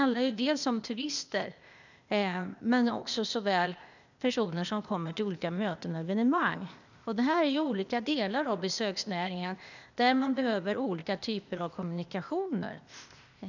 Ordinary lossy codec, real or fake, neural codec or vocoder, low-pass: none; fake; codec, 16 kHz, 0.8 kbps, ZipCodec; 7.2 kHz